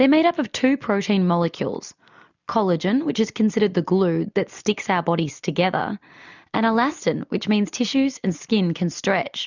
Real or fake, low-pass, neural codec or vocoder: real; 7.2 kHz; none